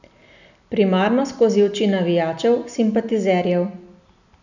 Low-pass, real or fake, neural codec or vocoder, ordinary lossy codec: 7.2 kHz; real; none; none